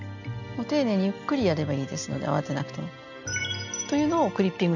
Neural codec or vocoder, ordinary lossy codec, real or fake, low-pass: none; none; real; 7.2 kHz